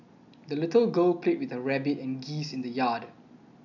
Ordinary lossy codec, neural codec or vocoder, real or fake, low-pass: none; none; real; 7.2 kHz